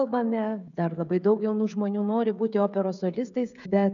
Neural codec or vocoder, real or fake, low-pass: codec, 16 kHz, 16 kbps, FreqCodec, smaller model; fake; 7.2 kHz